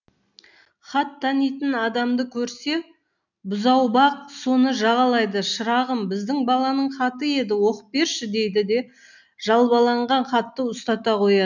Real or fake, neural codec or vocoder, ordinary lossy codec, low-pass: real; none; none; 7.2 kHz